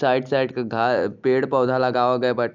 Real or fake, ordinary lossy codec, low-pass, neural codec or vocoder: real; none; 7.2 kHz; none